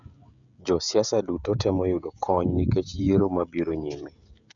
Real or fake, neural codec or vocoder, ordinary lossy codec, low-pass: fake; codec, 16 kHz, 16 kbps, FreqCodec, smaller model; none; 7.2 kHz